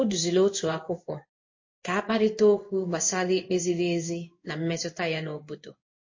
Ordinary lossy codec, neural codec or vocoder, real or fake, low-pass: MP3, 32 kbps; codec, 16 kHz in and 24 kHz out, 1 kbps, XY-Tokenizer; fake; 7.2 kHz